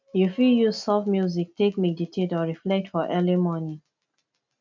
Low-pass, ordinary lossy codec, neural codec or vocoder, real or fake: 7.2 kHz; MP3, 64 kbps; none; real